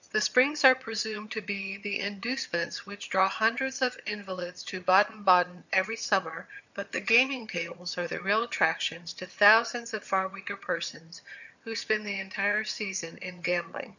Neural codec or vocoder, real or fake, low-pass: vocoder, 22.05 kHz, 80 mel bands, HiFi-GAN; fake; 7.2 kHz